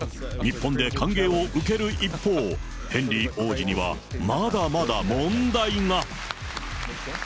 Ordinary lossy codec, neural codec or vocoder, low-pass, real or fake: none; none; none; real